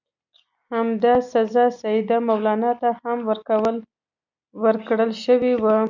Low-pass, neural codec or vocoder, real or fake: 7.2 kHz; none; real